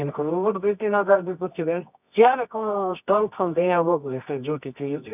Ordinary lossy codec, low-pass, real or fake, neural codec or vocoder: none; 3.6 kHz; fake; codec, 24 kHz, 0.9 kbps, WavTokenizer, medium music audio release